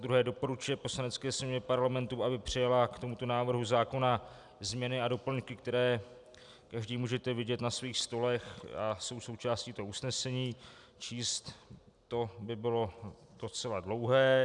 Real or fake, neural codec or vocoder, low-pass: real; none; 10.8 kHz